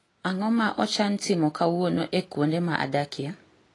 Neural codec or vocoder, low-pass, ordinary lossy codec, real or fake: none; 10.8 kHz; AAC, 32 kbps; real